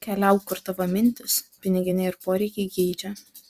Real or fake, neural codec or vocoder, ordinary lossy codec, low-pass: fake; vocoder, 44.1 kHz, 128 mel bands every 256 samples, BigVGAN v2; Opus, 64 kbps; 14.4 kHz